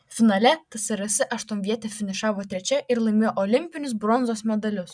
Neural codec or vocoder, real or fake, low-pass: none; real; 9.9 kHz